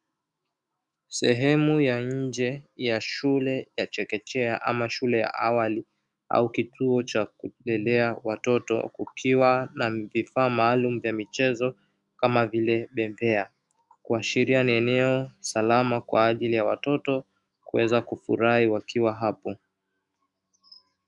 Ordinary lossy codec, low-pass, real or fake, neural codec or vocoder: Opus, 64 kbps; 10.8 kHz; fake; autoencoder, 48 kHz, 128 numbers a frame, DAC-VAE, trained on Japanese speech